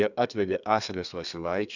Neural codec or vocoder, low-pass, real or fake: codec, 32 kHz, 1.9 kbps, SNAC; 7.2 kHz; fake